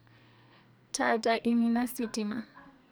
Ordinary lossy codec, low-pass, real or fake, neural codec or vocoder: none; none; fake; codec, 44.1 kHz, 2.6 kbps, SNAC